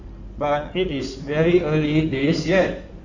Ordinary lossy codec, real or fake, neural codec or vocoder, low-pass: none; fake; codec, 16 kHz in and 24 kHz out, 2.2 kbps, FireRedTTS-2 codec; 7.2 kHz